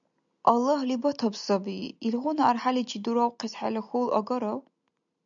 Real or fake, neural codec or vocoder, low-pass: real; none; 7.2 kHz